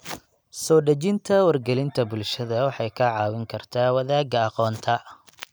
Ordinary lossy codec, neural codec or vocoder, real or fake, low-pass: none; none; real; none